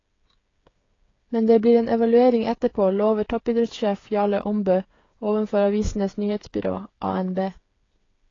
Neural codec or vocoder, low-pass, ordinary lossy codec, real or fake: codec, 16 kHz, 8 kbps, FreqCodec, smaller model; 7.2 kHz; AAC, 32 kbps; fake